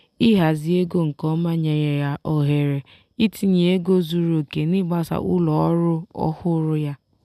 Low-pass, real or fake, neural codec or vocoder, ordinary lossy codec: 14.4 kHz; real; none; none